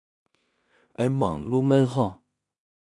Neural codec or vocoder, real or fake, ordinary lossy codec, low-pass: codec, 16 kHz in and 24 kHz out, 0.4 kbps, LongCat-Audio-Codec, two codebook decoder; fake; AAC, 64 kbps; 10.8 kHz